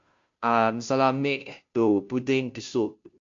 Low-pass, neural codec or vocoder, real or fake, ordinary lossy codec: 7.2 kHz; codec, 16 kHz, 0.5 kbps, FunCodec, trained on Chinese and English, 25 frames a second; fake; MP3, 48 kbps